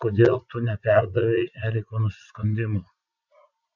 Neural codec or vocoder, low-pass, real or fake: vocoder, 44.1 kHz, 80 mel bands, Vocos; 7.2 kHz; fake